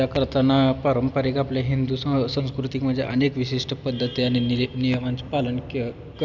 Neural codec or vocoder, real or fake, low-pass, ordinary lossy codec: none; real; 7.2 kHz; none